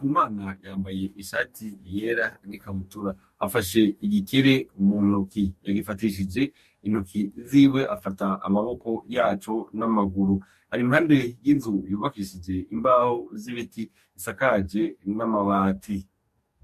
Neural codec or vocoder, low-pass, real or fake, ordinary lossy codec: codec, 44.1 kHz, 2.6 kbps, DAC; 14.4 kHz; fake; MP3, 64 kbps